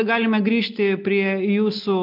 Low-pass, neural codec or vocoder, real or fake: 5.4 kHz; none; real